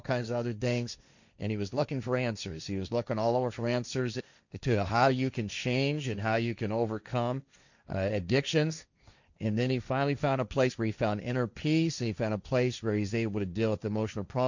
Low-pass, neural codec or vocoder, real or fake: 7.2 kHz; codec, 16 kHz, 1.1 kbps, Voila-Tokenizer; fake